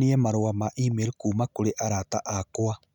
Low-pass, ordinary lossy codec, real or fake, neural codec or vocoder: 19.8 kHz; none; real; none